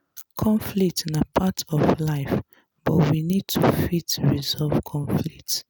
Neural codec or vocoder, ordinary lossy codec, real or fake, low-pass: none; none; real; none